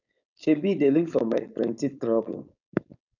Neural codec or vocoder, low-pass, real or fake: codec, 16 kHz, 4.8 kbps, FACodec; 7.2 kHz; fake